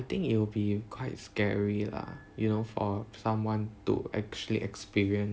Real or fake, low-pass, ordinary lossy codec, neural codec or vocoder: real; none; none; none